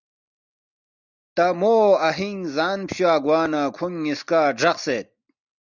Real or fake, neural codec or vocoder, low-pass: real; none; 7.2 kHz